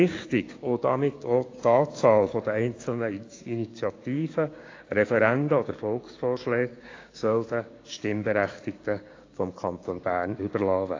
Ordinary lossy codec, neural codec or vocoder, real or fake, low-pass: AAC, 32 kbps; codec, 16 kHz, 6 kbps, DAC; fake; 7.2 kHz